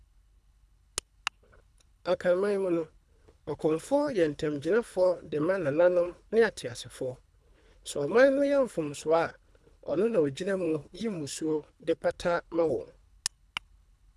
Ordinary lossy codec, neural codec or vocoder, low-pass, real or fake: none; codec, 24 kHz, 3 kbps, HILCodec; none; fake